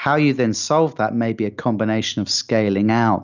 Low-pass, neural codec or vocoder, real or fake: 7.2 kHz; none; real